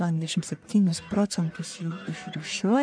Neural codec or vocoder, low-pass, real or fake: codec, 44.1 kHz, 1.7 kbps, Pupu-Codec; 9.9 kHz; fake